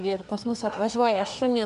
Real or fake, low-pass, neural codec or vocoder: fake; 10.8 kHz; codec, 24 kHz, 1 kbps, SNAC